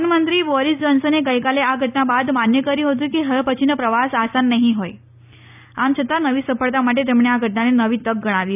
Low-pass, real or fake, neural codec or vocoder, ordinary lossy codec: 3.6 kHz; real; none; none